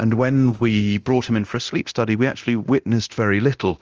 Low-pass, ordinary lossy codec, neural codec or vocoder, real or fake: 7.2 kHz; Opus, 24 kbps; codec, 24 kHz, 0.9 kbps, DualCodec; fake